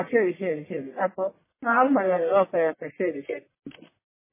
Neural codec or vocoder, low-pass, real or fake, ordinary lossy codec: codec, 44.1 kHz, 1.7 kbps, Pupu-Codec; 3.6 kHz; fake; MP3, 16 kbps